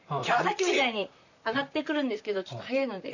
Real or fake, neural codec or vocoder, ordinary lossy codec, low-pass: fake; vocoder, 44.1 kHz, 128 mel bands, Pupu-Vocoder; none; 7.2 kHz